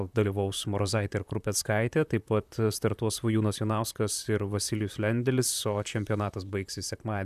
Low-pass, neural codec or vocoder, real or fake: 14.4 kHz; vocoder, 44.1 kHz, 128 mel bands, Pupu-Vocoder; fake